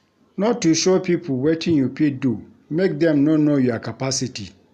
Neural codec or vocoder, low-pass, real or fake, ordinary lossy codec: none; 14.4 kHz; real; none